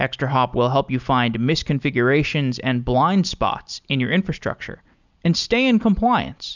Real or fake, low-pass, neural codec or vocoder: real; 7.2 kHz; none